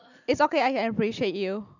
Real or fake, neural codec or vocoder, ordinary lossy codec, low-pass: real; none; none; 7.2 kHz